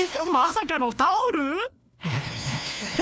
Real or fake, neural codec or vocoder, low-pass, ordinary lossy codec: fake; codec, 16 kHz, 1 kbps, FunCodec, trained on LibriTTS, 50 frames a second; none; none